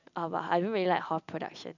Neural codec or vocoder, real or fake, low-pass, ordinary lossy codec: none; real; 7.2 kHz; none